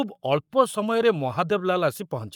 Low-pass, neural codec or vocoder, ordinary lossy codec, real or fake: 19.8 kHz; codec, 44.1 kHz, 7.8 kbps, Pupu-Codec; none; fake